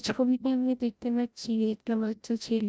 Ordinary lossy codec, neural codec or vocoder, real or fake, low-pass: none; codec, 16 kHz, 0.5 kbps, FreqCodec, larger model; fake; none